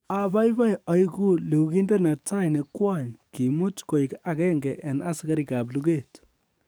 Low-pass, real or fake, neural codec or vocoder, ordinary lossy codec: none; fake; codec, 44.1 kHz, 7.8 kbps, DAC; none